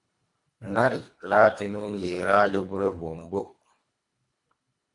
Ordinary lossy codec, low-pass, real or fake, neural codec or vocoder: AAC, 64 kbps; 10.8 kHz; fake; codec, 24 kHz, 1.5 kbps, HILCodec